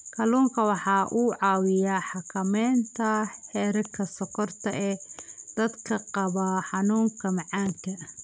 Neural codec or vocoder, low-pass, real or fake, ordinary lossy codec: none; none; real; none